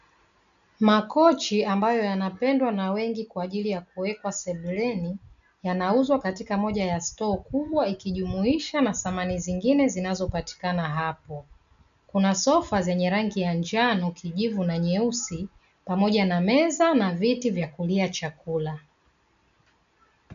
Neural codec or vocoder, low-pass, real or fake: none; 7.2 kHz; real